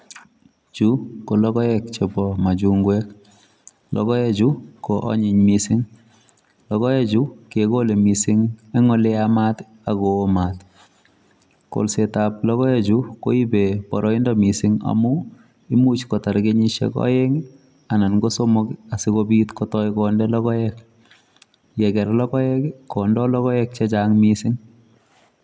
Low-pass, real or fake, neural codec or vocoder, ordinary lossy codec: none; real; none; none